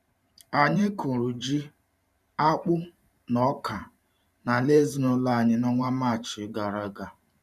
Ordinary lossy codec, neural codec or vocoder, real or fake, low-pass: none; vocoder, 44.1 kHz, 128 mel bands every 512 samples, BigVGAN v2; fake; 14.4 kHz